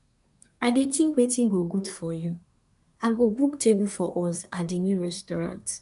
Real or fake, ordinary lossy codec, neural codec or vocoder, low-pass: fake; none; codec, 24 kHz, 1 kbps, SNAC; 10.8 kHz